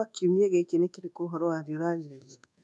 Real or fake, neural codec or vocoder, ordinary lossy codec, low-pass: fake; codec, 24 kHz, 1.2 kbps, DualCodec; none; none